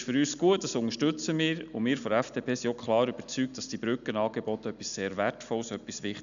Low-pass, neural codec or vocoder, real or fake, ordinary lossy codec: 7.2 kHz; none; real; MP3, 96 kbps